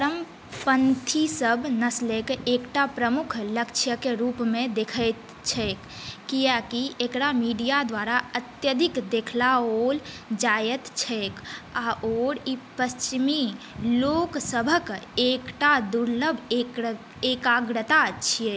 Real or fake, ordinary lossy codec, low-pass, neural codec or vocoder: real; none; none; none